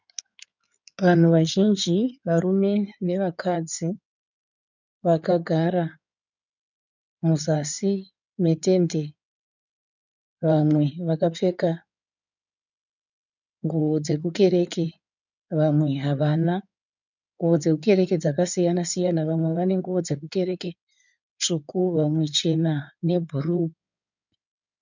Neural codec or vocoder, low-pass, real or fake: codec, 16 kHz in and 24 kHz out, 2.2 kbps, FireRedTTS-2 codec; 7.2 kHz; fake